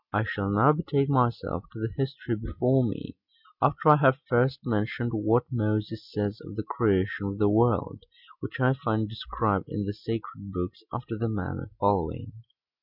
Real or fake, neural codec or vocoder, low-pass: real; none; 5.4 kHz